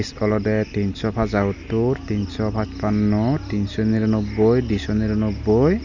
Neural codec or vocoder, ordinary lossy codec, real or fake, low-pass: none; none; real; 7.2 kHz